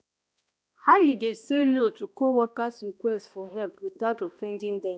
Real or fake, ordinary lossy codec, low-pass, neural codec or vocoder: fake; none; none; codec, 16 kHz, 1 kbps, X-Codec, HuBERT features, trained on balanced general audio